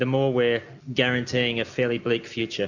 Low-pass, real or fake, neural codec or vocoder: 7.2 kHz; real; none